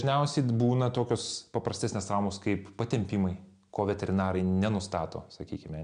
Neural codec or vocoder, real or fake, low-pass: none; real; 9.9 kHz